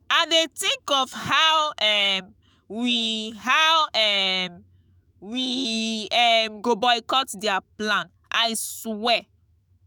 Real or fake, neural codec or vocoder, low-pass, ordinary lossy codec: fake; autoencoder, 48 kHz, 128 numbers a frame, DAC-VAE, trained on Japanese speech; none; none